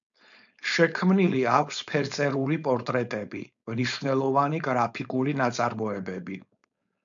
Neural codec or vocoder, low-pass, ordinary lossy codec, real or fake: codec, 16 kHz, 4.8 kbps, FACodec; 7.2 kHz; MP3, 64 kbps; fake